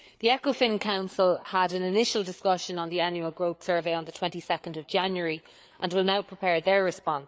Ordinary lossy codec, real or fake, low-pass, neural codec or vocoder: none; fake; none; codec, 16 kHz, 4 kbps, FreqCodec, larger model